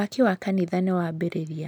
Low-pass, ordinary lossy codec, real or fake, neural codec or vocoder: none; none; real; none